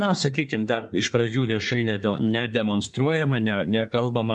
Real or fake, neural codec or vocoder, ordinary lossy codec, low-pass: fake; codec, 24 kHz, 1 kbps, SNAC; MP3, 96 kbps; 10.8 kHz